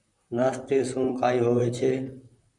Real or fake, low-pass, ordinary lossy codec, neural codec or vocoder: fake; 10.8 kHz; MP3, 96 kbps; vocoder, 44.1 kHz, 128 mel bands, Pupu-Vocoder